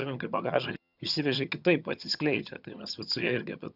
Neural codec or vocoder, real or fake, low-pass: vocoder, 22.05 kHz, 80 mel bands, HiFi-GAN; fake; 5.4 kHz